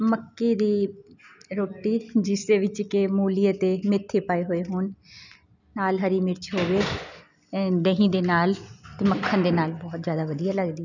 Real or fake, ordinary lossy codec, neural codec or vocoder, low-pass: real; none; none; 7.2 kHz